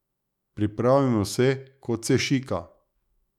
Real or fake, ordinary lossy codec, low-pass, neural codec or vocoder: fake; none; 19.8 kHz; autoencoder, 48 kHz, 128 numbers a frame, DAC-VAE, trained on Japanese speech